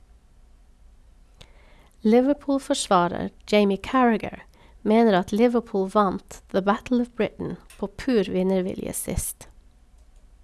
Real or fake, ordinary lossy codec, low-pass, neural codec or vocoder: real; none; none; none